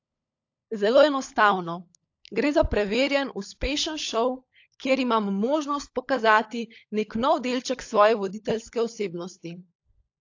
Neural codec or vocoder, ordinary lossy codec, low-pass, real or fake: codec, 16 kHz, 16 kbps, FunCodec, trained on LibriTTS, 50 frames a second; AAC, 48 kbps; 7.2 kHz; fake